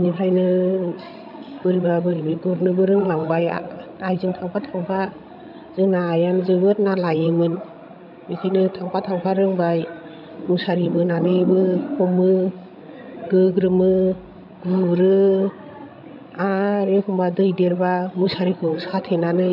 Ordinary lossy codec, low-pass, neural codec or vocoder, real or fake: none; 5.4 kHz; codec, 16 kHz, 16 kbps, FreqCodec, larger model; fake